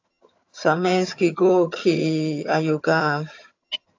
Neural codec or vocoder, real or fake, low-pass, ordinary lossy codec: vocoder, 22.05 kHz, 80 mel bands, HiFi-GAN; fake; 7.2 kHz; AAC, 48 kbps